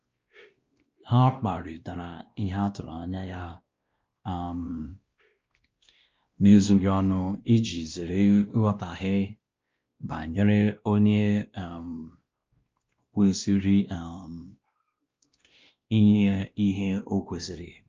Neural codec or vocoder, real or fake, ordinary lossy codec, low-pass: codec, 16 kHz, 1 kbps, X-Codec, WavLM features, trained on Multilingual LibriSpeech; fake; Opus, 24 kbps; 7.2 kHz